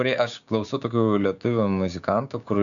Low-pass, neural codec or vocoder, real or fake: 7.2 kHz; codec, 16 kHz, 6 kbps, DAC; fake